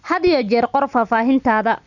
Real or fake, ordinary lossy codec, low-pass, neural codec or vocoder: real; none; 7.2 kHz; none